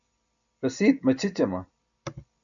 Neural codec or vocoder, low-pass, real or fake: none; 7.2 kHz; real